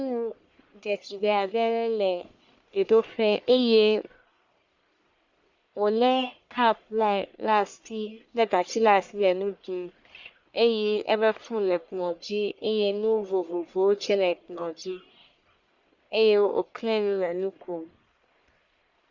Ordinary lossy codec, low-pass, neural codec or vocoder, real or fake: Opus, 64 kbps; 7.2 kHz; codec, 44.1 kHz, 1.7 kbps, Pupu-Codec; fake